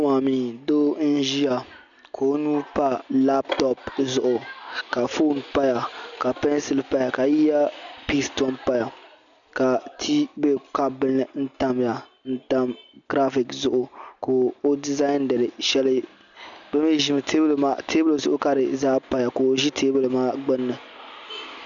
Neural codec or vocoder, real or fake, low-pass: none; real; 7.2 kHz